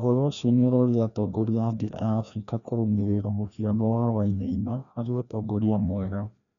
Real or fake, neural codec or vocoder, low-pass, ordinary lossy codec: fake; codec, 16 kHz, 1 kbps, FreqCodec, larger model; 7.2 kHz; none